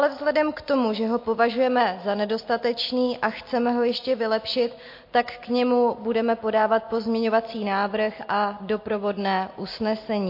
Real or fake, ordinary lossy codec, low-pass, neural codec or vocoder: real; MP3, 32 kbps; 5.4 kHz; none